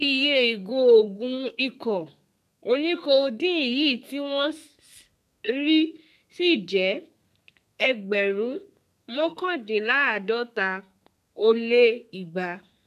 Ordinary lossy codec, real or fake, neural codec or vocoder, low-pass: none; fake; codec, 32 kHz, 1.9 kbps, SNAC; 14.4 kHz